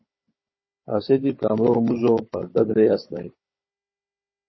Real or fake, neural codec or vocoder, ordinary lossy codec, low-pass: fake; codec, 16 kHz, 16 kbps, FunCodec, trained on Chinese and English, 50 frames a second; MP3, 24 kbps; 7.2 kHz